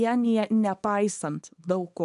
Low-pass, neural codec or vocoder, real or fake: 10.8 kHz; codec, 24 kHz, 0.9 kbps, WavTokenizer, small release; fake